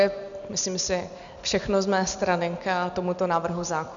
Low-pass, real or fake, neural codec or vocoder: 7.2 kHz; real; none